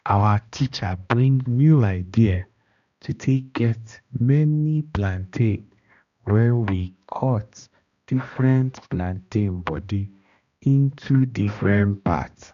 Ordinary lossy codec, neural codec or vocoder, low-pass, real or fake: AAC, 96 kbps; codec, 16 kHz, 1 kbps, X-Codec, HuBERT features, trained on balanced general audio; 7.2 kHz; fake